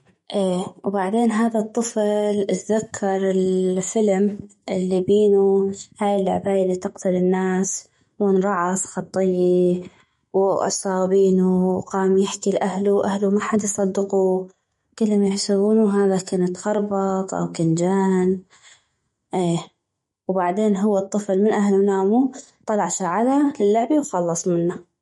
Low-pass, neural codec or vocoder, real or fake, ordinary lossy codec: 19.8 kHz; autoencoder, 48 kHz, 128 numbers a frame, DAC-VAE, trained on Japanese speech; fake; MP3, 48 kbps